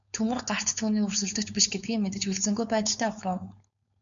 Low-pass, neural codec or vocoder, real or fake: 7.2 kHz; codec, 16 kHz, 4.8 kbps, FACodec; fake